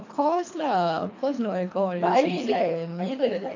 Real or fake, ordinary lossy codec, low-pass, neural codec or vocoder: fake; AAC, 48 kbps; 7.2 kHz; codec, 24 kHz, 3 kbps, HILCodec